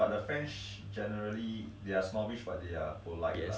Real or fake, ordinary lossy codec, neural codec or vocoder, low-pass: real; none; none; none